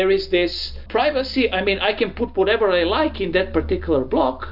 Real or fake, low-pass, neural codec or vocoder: real; 5.4 kHz; none